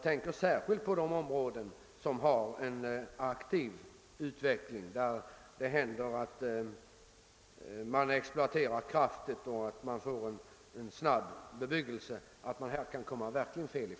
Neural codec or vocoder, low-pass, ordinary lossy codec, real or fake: none; none; none; real